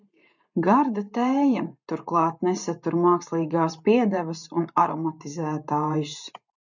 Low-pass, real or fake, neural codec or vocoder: 7.2 kHz; fake; vocoder, 44.1 kHz, 128 mel bands every 512 samples, BigVGAN v2